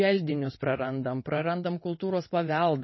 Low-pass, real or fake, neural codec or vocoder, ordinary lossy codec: 7.2 kHz; fake; vocoder, 22.05 kHz, 80 mel bands, WaveNeXt; MP3, 24 kbps